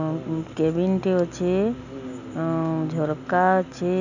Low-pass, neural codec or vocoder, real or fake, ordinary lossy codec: 7.2 kHz; none; real; none